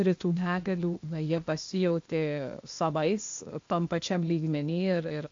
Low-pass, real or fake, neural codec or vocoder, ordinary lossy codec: 7.2 kHz; fake; codec, 16 kHz, 0.8 kbps, ZipCodec; MP3, 48 kbps